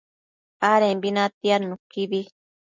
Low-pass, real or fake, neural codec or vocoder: 7.2 kHz; real; none